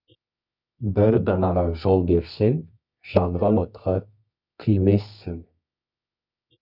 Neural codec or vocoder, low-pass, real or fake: codec, 24 kHz, 0.9 kbps, WavTokenizer, medium music audio release; 5.4 kHz; fake